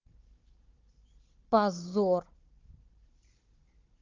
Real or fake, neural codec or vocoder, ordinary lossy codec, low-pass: fake; codec, 16 kHz, 16 kbps, FunCodec, trained on Chinese and English, 50 frames a second; Opus, 32 kbps; 7.2 kHz